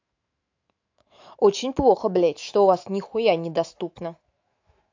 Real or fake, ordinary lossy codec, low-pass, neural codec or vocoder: fake; AAC, 48 kbps; 7.2 kHz; autoencoder, 48 kHz, 128 numbers a frame, DAC-VAE, trained on Japanese speech